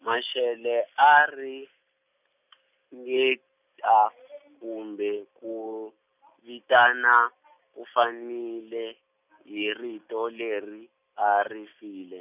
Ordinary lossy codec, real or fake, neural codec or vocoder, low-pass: none; real; none; 3.6 kHz